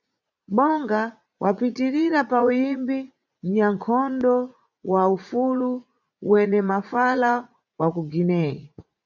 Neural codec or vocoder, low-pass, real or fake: vocoder, 44.1 kHz, 80 mel bands, Vocos; 7.2 kHz; fake